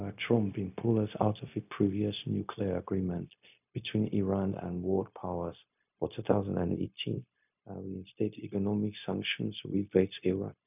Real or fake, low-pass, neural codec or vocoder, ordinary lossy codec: fake; 3.6 kHz; codec, 16 kHz, 0.4 kbps, LongCat-Audio-Codec; none